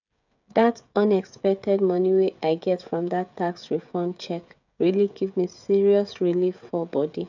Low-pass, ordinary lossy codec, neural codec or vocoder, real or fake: 7.2 kHz; none; codec, 16 kHz, 16 kbps, FreqCodec, smaller model; fake